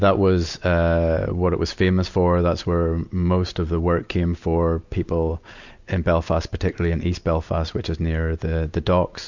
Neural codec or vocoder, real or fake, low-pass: none; real; 7.2 kHz